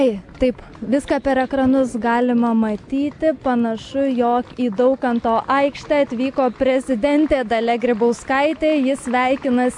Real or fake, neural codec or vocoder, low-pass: real; none; 10.8 kHz